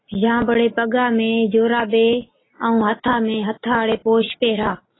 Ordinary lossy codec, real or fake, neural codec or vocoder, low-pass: AAC, 16 kbps; real; none; 7.2 kHz